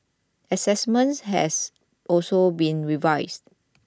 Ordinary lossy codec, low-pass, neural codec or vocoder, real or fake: none; none; none; real